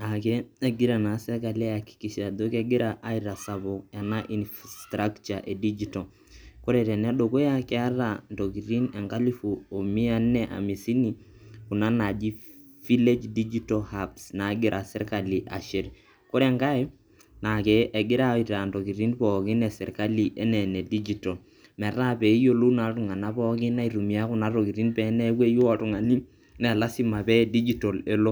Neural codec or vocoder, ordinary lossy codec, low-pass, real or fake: none; none; none; real